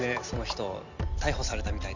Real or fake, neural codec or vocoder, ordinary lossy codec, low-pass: fake; vocoder, 44.1 kHz, 128 mel bands every 256 samples, BigVGAN v2; none; 7.2 kHz